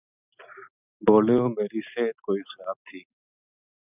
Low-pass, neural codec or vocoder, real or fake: 3.6 kHz; none; real